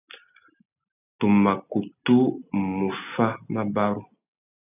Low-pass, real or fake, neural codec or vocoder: 3.6 kHz; real; none